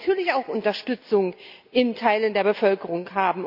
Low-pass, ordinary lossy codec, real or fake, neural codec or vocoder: 5.4 kHz; none; real; none